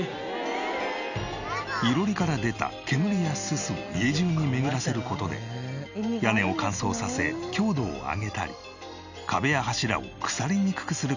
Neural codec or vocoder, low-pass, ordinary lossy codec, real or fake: none; 7.2 kHz; none; real